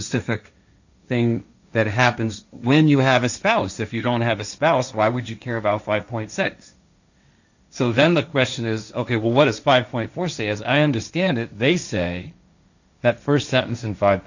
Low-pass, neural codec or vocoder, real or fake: 7.2 kHz; codec, 16 kHz, 1.1 kbps, Voila-Tokenizer; fake